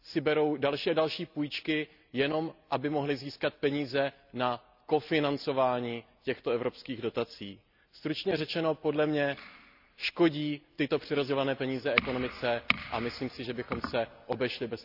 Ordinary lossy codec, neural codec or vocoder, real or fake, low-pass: none; none; real; 5.4 kHz